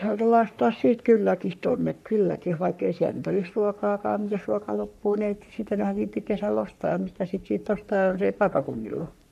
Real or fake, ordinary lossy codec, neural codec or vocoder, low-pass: fake; none; codec, 44.1 kHz, 3.4 kbps, Pupu-Codec; 14.4 kHz